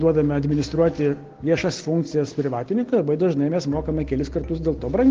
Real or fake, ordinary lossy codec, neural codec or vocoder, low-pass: real; Opus, 16 kbps; none; 7.2 kHz